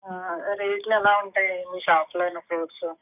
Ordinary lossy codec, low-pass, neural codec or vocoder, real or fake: none; 3.6 kHz; codec, 44.1 kHz, 7.8 kbps, DAC; fake